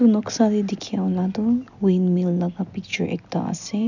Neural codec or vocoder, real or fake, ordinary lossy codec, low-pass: none; real; none; 7.2 kHz